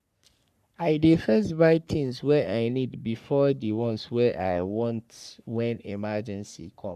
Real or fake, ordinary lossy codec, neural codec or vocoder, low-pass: fake; none; codec, 44.1 kHz, 3.4 kbps, Pupu-Codec; 14.4 kHz